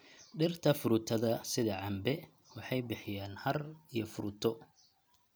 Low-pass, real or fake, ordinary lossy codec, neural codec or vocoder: none; real; none; none